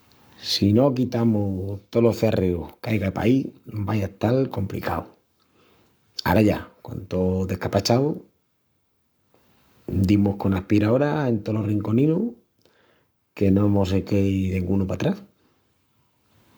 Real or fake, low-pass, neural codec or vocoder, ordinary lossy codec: fake; none; codec, 44.1 kHz, 7.8 kbps, Pupu-Codec; none